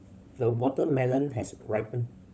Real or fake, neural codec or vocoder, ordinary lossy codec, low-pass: fake; codec, 16 kHz, 16 kbps, FunCodec, trained on LibriTTS, 50 frames a second; none; none